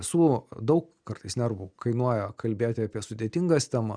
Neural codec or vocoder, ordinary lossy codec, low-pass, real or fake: none; Opus, 64 kbps; 9.9 kHz; real